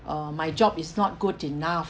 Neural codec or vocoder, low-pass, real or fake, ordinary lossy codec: none; none; real; none